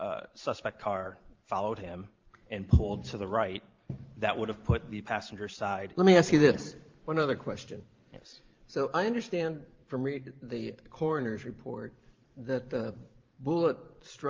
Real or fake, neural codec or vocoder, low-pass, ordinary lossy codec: fake; vocoder, 44.1 kHz, 128 mel bands every 512 samples, BigVGAN v2; 7.2 kHz; Opus, 32 kbps